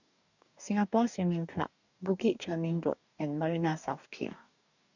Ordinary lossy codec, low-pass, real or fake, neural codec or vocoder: none; 7.2 kHz; fake; codec, 44.1 kHz, 2.6 kbps, DAC